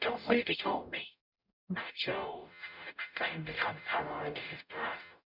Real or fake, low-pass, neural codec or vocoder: fake; 5.4 kHz; codec, 44.1 kHz, 0.9 kbps, DAC